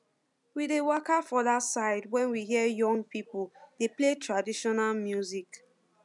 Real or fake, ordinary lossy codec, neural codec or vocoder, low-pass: real; MP3, 96 kbps; none; 10.8 kHz